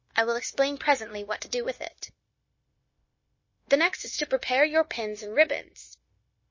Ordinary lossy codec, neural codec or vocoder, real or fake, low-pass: MP3, 32 kbps; codec, 16 kHz, 0.9 kbps, LongCat-Audio-Codec; fake; 7.2 kHz